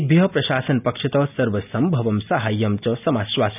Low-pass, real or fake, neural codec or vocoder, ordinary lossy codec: 3.6 kHz; real; none; none